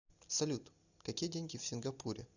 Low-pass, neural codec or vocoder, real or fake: 7.2 kHz; none; real